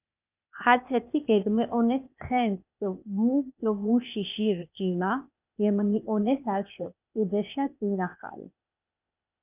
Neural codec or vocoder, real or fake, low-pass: codec, 16 kHz, 0.8 kbps, ZipCodec; fake; 3.6 kHz